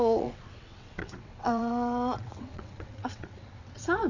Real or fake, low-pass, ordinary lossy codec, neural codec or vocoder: fake; 7.2 kHz; none; vocoder, 22.05 kHz, 80 mel bands, WaveNeXt